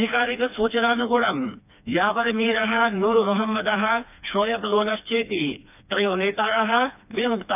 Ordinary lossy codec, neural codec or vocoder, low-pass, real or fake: none; codec, 16 kHz, 2 kbps, FreqCodec, smaller model; 3.6 kHz; fake